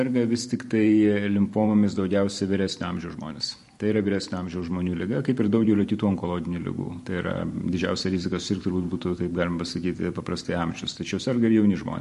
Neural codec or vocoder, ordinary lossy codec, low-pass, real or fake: vocoder, 44.1 kHz, 128 mel bands every 512 samples, BigVGAN v2; MP3, 48 kbps; 14.4 kHz; fake